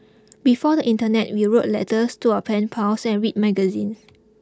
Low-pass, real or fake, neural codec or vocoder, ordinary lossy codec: none; real; none; none